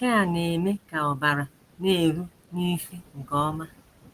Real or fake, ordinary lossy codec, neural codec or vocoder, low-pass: real; Opus, 24 kbps; none; 14.4 kHz